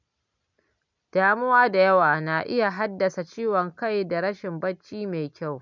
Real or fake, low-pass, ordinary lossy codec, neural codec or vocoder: real; 7.2 kHz; none; none